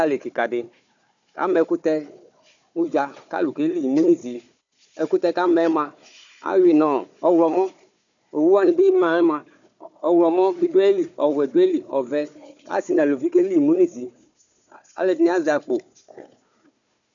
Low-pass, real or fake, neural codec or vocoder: 7.2 kHz; fake; codec, 16 kHz, 4 kbps, FunCodec, trained on Chinese and English, 50 frames a second